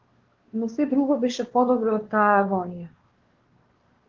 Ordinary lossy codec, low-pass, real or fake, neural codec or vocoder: Opus, 16 kbps; 7.2 kHz; fake; codec, 16 kHz, 2 kbps, X-Codec, WavLM features, trained on Multilingual LibriSpeech